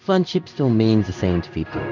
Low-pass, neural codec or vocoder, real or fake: 7.2 kHz; codec, 16 kHz in and 24 kHz out, 1 kbps, XY-Tokenizer; fake